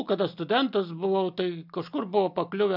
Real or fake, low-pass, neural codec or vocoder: real; 5.4 kHz; none